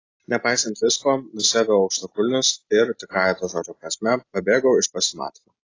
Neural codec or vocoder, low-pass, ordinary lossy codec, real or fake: none; 7.2 kHz; AAC, 32 kbps; real